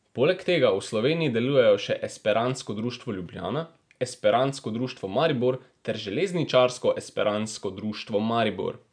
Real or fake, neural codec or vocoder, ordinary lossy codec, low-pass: real; none; none; 9.9 kHz